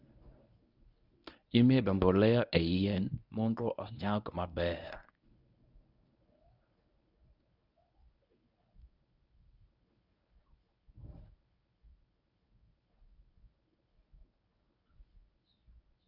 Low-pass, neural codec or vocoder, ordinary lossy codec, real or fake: 5.4 kHz; codec, 24 kHz, 0.9 kbps, WavTokenizer, medium speech release version 1; none; fake